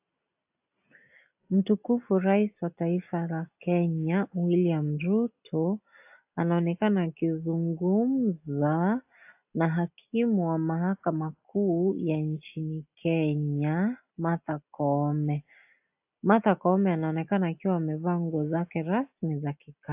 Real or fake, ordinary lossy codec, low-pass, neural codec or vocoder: real; AAC, 32 kbps; 3.6 kHz; none